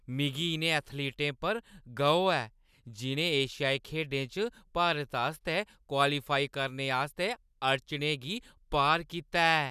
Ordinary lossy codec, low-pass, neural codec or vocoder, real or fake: none; 14.4 kHz; none; real